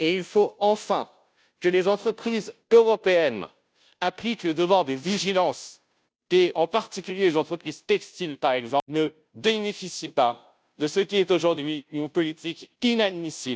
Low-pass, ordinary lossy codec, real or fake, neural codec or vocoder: none; none; fake; codec, 16 kHz, 0.5 kbps, FunCodec, trained on Chinese and English, 25 frames a second